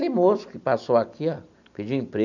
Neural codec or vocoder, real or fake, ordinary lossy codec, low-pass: none; real; none; 7.2 kHz